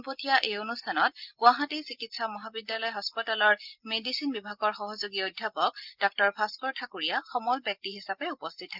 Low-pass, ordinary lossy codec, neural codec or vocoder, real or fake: 5.4 kHz; Opus, 24 kbps; none; real